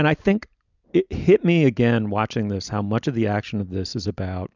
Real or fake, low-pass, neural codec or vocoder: real; 7.2 kHz; none